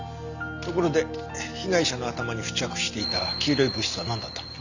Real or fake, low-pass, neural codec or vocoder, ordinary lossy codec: real; 7.2 kHz; none; none